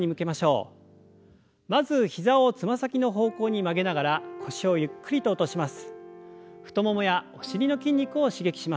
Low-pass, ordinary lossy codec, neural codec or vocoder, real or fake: none; none; none; real